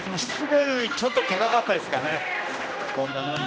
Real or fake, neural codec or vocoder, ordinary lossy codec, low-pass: fake; codec, 16 kHz, 1 kbps, X-Codec, HuBERT features, trained on general audio; none; none